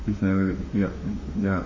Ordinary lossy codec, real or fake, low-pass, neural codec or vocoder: MP3, 32 kbps; fake; 7.2 kHz; autoencoder, 48 kHz, 32 numbers a frame, DAC-VAE, trained on Japanese speech